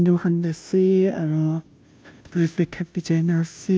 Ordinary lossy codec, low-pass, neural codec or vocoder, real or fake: none; none; codec, 16 kHz, 0.5 kbps, FunCodec, trained on Chinese and English, 25 frames a second; fake